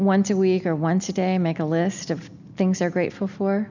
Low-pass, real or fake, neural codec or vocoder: 7.2 kHz; real; none